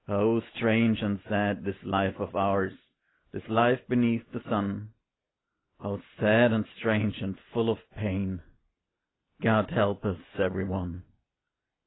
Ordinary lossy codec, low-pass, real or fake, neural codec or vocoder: AAC, 16 kbps; 7.2 kHz; real; none